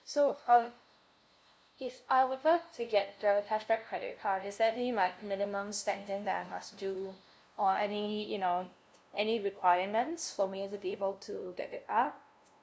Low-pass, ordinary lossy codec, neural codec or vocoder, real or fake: none; none; codec, 16 kHz, 0.5 kbps, FunCodec, trained on LibriTTS, 25 frames a second; fake